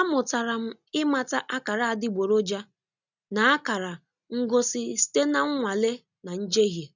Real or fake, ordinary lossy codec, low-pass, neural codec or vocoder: real; none; 7.2 kHz; none